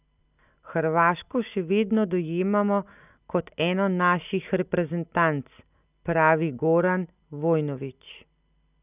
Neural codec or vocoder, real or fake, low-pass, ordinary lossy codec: none; real; 3.6 kHz; none